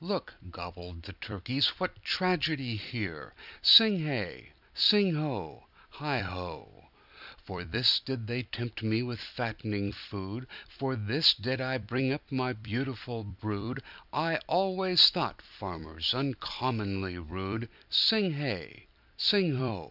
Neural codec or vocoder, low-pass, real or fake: vocoder, 44.1 kHz, 128 mel bands every 256 samples, BigVGAN v2; 5.4 kHz; fake